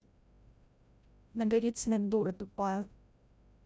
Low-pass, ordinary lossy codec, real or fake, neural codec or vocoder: none; none; fake; codec, 16 kHz, 0.5 kbps, FreqCodec, larger model